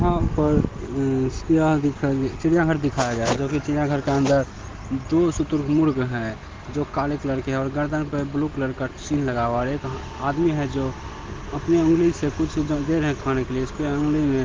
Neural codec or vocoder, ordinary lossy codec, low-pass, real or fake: none; Opus, 16 kbps; 7.2 kHz; real